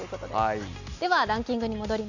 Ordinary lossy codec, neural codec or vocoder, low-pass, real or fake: none; none; 7.2 kHz; real